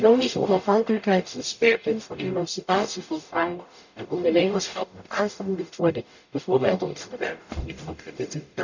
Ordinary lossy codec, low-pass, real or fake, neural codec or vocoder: none; 7.2 kHz; fake; codec, 44.1 kHz, 0.9 kbps, DAC